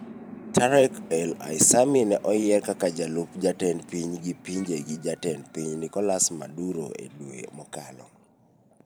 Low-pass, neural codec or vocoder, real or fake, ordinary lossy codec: none; vocoder, 44.1 kHz, 128 mel bands every 512 samples, BigVGAN v2; fake; none